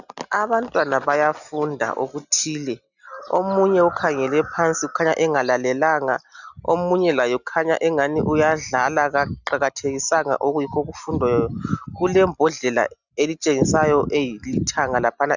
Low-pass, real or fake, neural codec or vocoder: 7.2 kHz; real; none